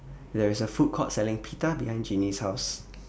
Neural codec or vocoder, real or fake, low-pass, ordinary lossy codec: none; real; none; none